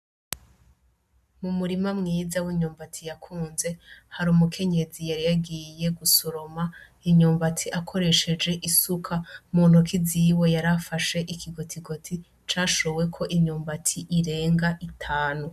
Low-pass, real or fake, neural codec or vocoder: 14.4 kHz; real; none